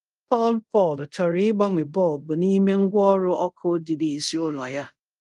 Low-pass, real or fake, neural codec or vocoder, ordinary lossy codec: 10.8 kHz; fake; codec, 24 kHz, 0.5 kbps, DualCodec; Opus, 24 kbps